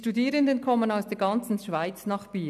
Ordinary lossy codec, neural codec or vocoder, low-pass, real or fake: none; none; 14.4 kHz; real